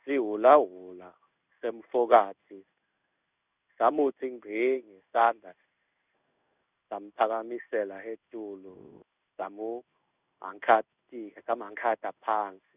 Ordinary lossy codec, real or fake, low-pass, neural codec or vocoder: none; fake; 3.6 kHz; codec, 16 kHz in and 24 kHz out, 1 kbps, XY-Tokenizer